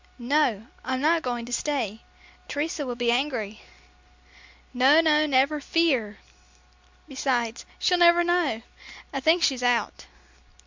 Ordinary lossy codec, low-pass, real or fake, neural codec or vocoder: MP3, 64 kbps; 7.2 kHz; real; none